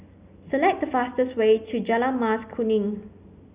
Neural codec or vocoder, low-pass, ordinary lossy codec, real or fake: none; 3.6 kHz; Opus, 64 kbps; real